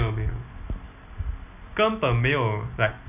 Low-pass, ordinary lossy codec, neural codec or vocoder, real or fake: 3.6 kHz; none; none; real